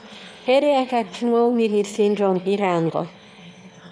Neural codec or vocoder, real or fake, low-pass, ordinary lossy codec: autoencoder, 22.05 kHz, a latent of 192 numbers a frame, VITS, trained on one speaker; fake; none; none